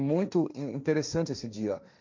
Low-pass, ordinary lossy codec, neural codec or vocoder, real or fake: 7.2 kHz; MP3, 64 kbps; codec, 16 kHz, 1.1 kbps, Voila-Tokenizer; fake